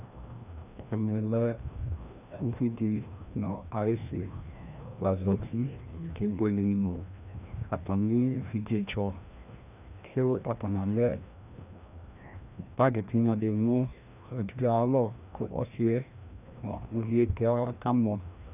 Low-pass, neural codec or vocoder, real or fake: 3.6 kHz; codec, 16 kHz, 1 kbps, FreqCodec, larger model; fake